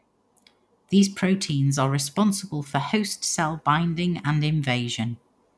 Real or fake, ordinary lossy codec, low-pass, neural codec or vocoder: real; none; none; none